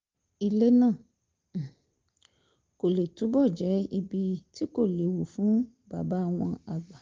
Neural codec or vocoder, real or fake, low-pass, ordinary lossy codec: none; real; 7.2 kHz; Opus, 32 kbps